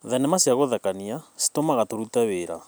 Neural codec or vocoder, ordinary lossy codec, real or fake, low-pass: none; none; real; none